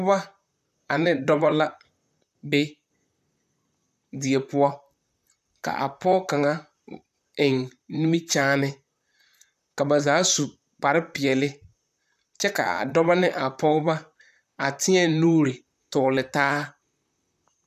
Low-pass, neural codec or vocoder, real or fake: 14.4 kHz; vocoder, 44.1 kHz, 128 mel bands, Pupu-Vocoder; fake